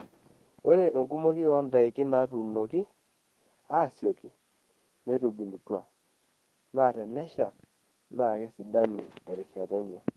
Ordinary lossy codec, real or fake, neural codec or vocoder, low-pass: Opus, 24 kbps; fake; codec, 32 kHz, 1.9 kbps, SNAC; 14.4 kHz